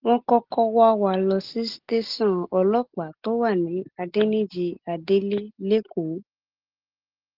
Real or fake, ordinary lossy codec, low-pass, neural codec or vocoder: real; Opus, 16 kbps; 5.4 kHz; none